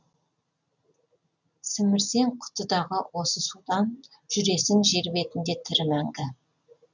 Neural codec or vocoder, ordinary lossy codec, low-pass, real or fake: none; none; 7.2 kHz; real